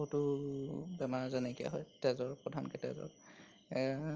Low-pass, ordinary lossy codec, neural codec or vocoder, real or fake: 7.2 kHz; Opus, 24 kbps; none; real